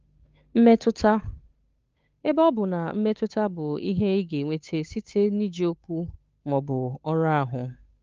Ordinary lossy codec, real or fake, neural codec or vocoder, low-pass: Opus, 24 kbps; fake; codec, 16 kHz, 8 kbps, FunCodec, trained on Chinese and English, 25 frames a second; 7.2 kHz